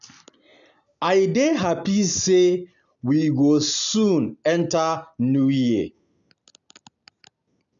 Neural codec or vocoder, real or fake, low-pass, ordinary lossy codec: none; real; 7.2 kHz; none